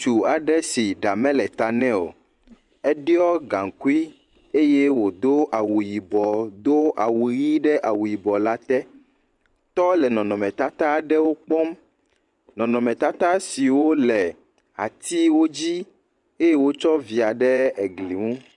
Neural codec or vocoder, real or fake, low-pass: vocoder, 24 kHz, 100 mel bands, Vocos; fake; 10.8 kHz